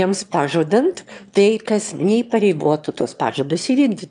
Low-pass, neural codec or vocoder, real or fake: 9.9 kHz; autoencoder, 22.05 kHz, a latent of 192 numbers a frame, VITS, trained on one speaker; fake